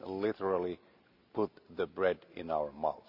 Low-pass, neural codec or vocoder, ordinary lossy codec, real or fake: 5.4 kHz; none; Opus, 64 kbps; real